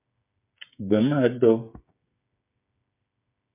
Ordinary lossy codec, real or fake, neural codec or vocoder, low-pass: MP3, 32 kbps; fake; codec, 16 kHz, 8 kbps, FreqCodec, smaller model; 3.6 kHz